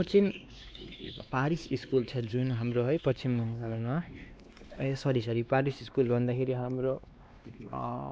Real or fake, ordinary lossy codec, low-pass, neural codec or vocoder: fake; none; none; codec, 16 kHz, 2 kbps, X-Codec, WavLM features, trained on Multilingual LibriSpeech